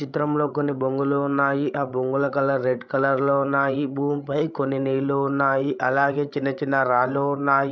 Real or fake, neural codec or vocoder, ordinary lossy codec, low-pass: fake; codec, 16 kHz, 16 kbps, FreqCodec, larger model; none; none